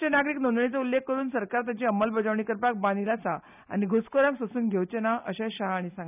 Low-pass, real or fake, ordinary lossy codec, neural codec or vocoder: 3.6 kHz; real; none; none